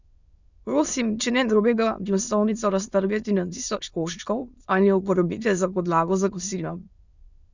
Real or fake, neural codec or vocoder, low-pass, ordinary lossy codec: fake; autoencoder, 22.05 kHz, a latent of 192 numbers a frame, VITS, trained on many speakers; 7.2 kHz; Opus, 64 kbps